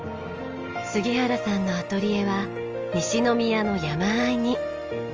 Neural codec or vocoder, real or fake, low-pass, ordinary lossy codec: none; real; 7.2 kHz; Opus, 24 kbps